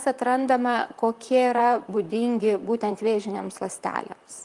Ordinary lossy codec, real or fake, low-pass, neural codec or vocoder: Opus, 32 kbps; fake; 10.8 kHz; vocoder, 44.1 kHz, 128 mel bands, Pupu-Vocoder